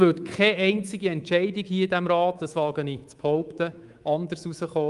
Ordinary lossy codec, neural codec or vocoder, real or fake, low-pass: Opus, 32 kbps; codec, 24 kHz, 3.1 kbps, DualCodec; fake; 10.8 kHz